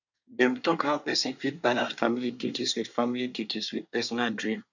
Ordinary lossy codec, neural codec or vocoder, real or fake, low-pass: none; codec, 24 kHz, 1 kbps, SNAC; fake; 7.2 kHz